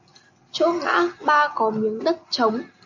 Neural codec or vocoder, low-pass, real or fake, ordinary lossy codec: none; 7.2 kHz; real; MP3, 48 kbps